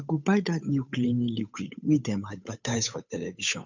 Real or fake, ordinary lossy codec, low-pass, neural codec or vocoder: fake; none; 7.2 kHz; codec, 16 kHz, 8 kbps, FunCodec, trained on Chinese and English, 25 frames a second